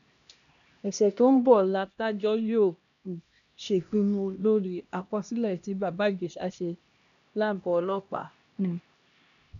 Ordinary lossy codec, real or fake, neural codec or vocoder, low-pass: none; fake; codec, 16 kHz, 1 kbps, X-Codec, HuBERT features, trained on LibriSpeech; 7.2 kHz